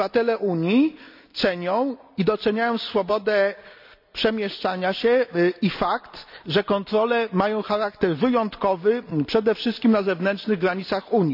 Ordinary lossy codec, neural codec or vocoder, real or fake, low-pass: none; none; real; 5.4 kHz